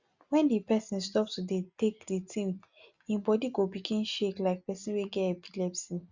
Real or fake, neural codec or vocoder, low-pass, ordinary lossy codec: real; none; 7.2 kHz; Opus, 64 kbps